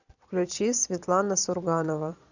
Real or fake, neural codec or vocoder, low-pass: fake; vocoder, 22.05 kHz, 80 mel bands, Vocos; 7.2 kHz